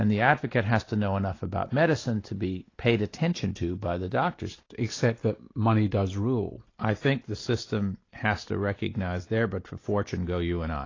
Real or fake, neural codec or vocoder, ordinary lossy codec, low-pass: real; none; AAC, 32 kbps; 7.2 kHz